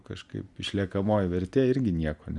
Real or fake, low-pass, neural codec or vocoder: real; 10.8 kHz; none